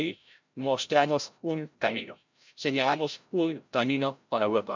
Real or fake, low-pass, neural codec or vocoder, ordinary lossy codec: fake; 7.2 kHz; codec, 16 kHz, 0.5 kbps, FreqCodec, larger model; MP3, 64 kbps